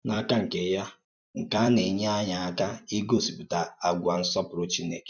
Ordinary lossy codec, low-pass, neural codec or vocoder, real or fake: none; 7.2 kHz; vocoder, 44.1 kHz, 128 mel bands every 256 samples, BigVGAN v2; fake